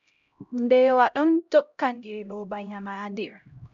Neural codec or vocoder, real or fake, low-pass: codec, 16 kHz, 0.5 kbps, X-Codec, HuBERT features, trained on LibriSpeech; fake; 7.2 kHz